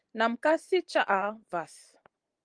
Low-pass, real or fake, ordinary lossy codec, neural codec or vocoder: 9.9 kHz; fake; Opus, 24 kbps; vocoder, 44.1 kHz, 128 mel bands every 512 samples, BigVGAN v2